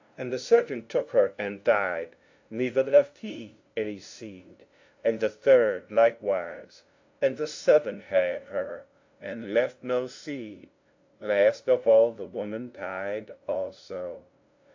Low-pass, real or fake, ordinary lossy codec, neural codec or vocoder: 7.2 kHz; fake; AAC, 48 kbps; codec, 16 kHz, 0.5 kbps, FunCodec, trained on LibriTTS, 25 frames a second